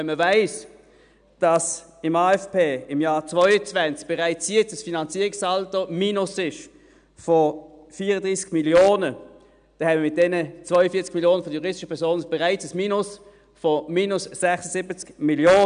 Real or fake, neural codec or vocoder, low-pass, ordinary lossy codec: real; none; 9.9 kHz; none